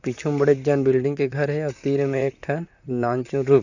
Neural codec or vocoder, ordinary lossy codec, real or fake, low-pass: vocoder, 44.1 kHz, 128 mel bands, Pupu-Vocoder; none; fake; 7.2 kHz